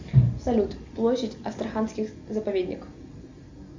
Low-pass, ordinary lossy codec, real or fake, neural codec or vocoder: 7.2 kHz; MP3, 64 kbps; real; none